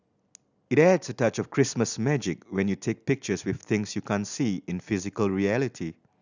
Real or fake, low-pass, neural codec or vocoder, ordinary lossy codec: real; 7.2 kHz; none; none